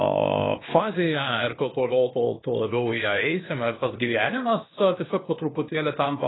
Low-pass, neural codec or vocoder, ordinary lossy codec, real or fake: 7.2 kHz; codec, 16 kHz, 0.8 kbps, ZipCodec; AAC, 16 kbps; fake